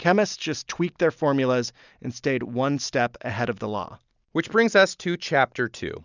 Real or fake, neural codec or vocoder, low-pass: real; none; 7.2 kHz